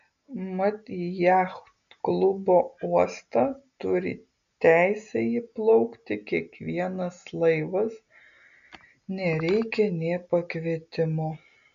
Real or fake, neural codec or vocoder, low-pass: real; none; 7.2 kHz